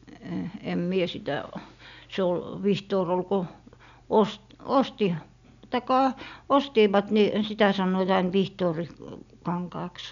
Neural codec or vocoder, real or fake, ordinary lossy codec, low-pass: none; real; none; 7.2 kHz